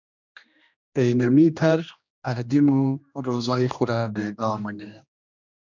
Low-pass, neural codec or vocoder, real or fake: 7.2 kHz; codec, 16 kHz, 1 kbps, X-Codec, HuBERT features, trained on general audio; fake